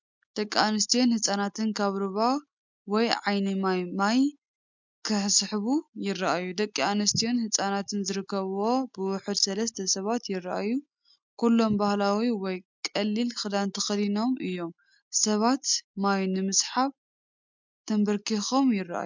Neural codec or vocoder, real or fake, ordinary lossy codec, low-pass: none; real; MP3, 64 kbps; 7.2 kHz